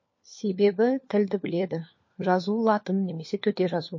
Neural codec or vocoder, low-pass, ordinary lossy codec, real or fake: codec, 16 kHz, 4 kbps, FunCodec, trained on LibriTTS, 50 frames a second; 7.2 kHz; MP3, 32 kbps; fake